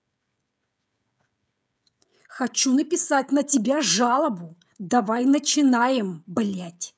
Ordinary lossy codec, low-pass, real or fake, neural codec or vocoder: none; none; fake; codec, 16 kHz, 16 kbps, FreqCodec, smaller model